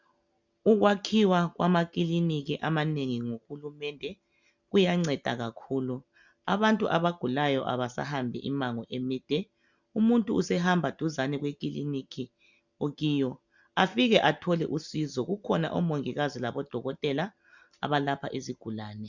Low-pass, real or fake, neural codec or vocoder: 7.2 kHz; real; none